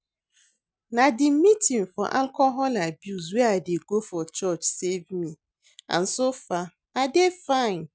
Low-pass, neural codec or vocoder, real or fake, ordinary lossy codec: none; none; real; none